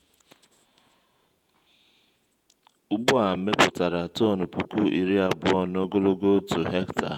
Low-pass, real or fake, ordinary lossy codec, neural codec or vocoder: 19.8 kHz; fake; none; vocoder, 48 kHz, 128 mel bands, Vocos